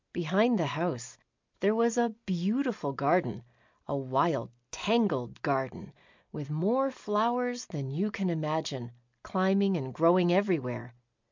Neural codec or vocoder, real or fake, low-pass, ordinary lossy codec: none; real; 7.2 kHz; MP3, 64 kbps